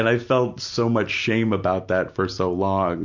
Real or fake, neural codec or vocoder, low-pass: real; none; 7.2 kHz